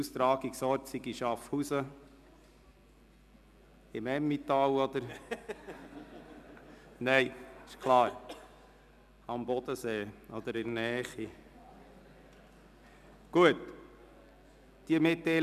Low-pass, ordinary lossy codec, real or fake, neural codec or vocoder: 14.4 kHz; none; real; none